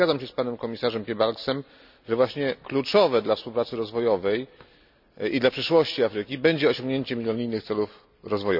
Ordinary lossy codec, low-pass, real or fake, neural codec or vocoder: none; 5.4 kHz; real; none